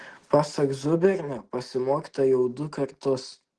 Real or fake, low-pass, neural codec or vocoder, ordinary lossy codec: real; 9.9 kHz; none; Opus, 16 kbps